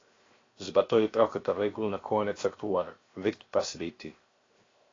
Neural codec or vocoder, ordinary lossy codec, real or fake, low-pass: codec, 16 kHz, 0.7 kbps, FocalCodec; AAC, 32 kbps; fake; 7.2 kHz